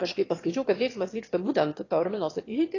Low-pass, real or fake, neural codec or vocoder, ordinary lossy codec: 7.2 kHz; fake; autoencoder, 22.05 kHz, a latent of 192 numbers a frame, VITS, trained on one speaker; AAC, 32 kbps